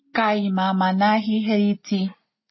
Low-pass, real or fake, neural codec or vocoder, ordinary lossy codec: 7.2 kHz; real; none; MP3, 24 kbps